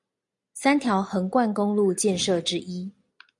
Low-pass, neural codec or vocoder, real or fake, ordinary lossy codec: 10.8 kHz; none; real; AAC, 64 kbps